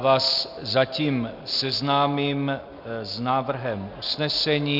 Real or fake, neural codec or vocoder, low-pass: real; none; 5.4 kHz